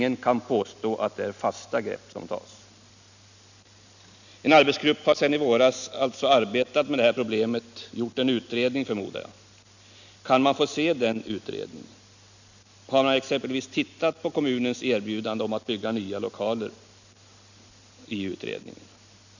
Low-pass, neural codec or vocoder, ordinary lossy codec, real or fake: 7.2 kHz; none; none; real